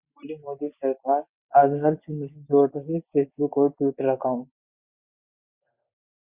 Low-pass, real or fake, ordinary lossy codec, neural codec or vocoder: 3.6 kHz; real; Opus, 64 kbps; none